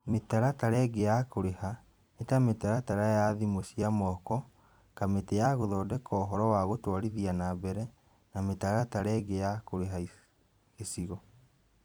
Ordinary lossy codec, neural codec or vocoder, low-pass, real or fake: none; vocoder, 44.1 kHz, 128 mel bands every 256 samples, BigVGAN v2; none; fake